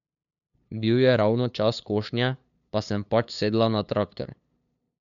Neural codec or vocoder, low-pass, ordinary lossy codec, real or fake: codec, 16 kHz, 2 kbps, FunCodec, trained on LibriTTS, 25 frames a second; 7.2 kHz; none; fake